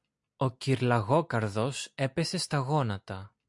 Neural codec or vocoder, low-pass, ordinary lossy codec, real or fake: none; 10.8 kHz; MP3, 64 kbps; real